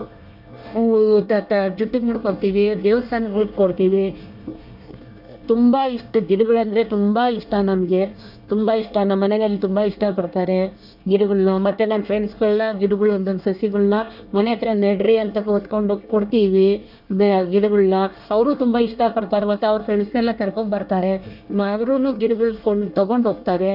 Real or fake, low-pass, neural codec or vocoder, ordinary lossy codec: fake; 5.4 kHz; codec, 24 kHz, 1 kbps, SNAC; none